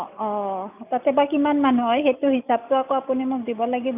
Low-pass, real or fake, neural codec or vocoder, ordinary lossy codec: 3.6 kHz; real; none; none